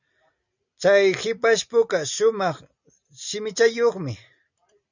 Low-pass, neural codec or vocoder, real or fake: 7.2 kHz; none; real